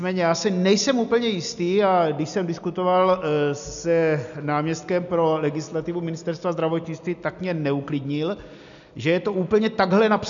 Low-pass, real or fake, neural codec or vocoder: 7.2 kHz; real; none